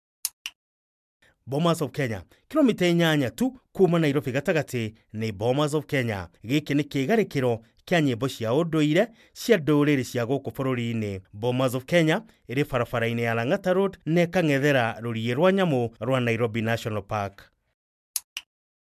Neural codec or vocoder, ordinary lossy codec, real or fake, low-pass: none; MP3, 96 kbps; real; 14.4 kHz